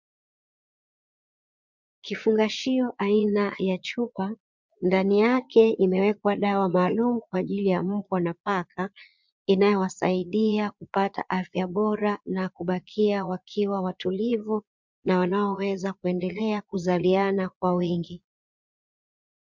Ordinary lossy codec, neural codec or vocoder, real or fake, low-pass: MP3, 64 kbps; vocoder, 22.05 kHz, 80 mel bands, Vocos; fake; 7.2 kHz